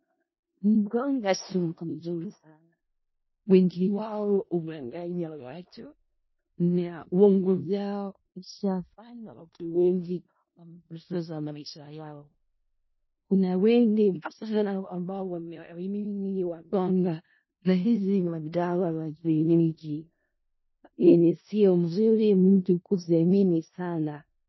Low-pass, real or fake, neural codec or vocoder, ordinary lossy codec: 7.2 kHz; fake; codec, 16 kHz in and 24 kHz out, 0.4 kbps, LongCat-Audio-Codec, four codebook decoder; MP3, 24 kbps